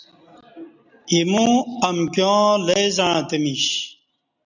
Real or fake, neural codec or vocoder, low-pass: real; none; 7.2 kHz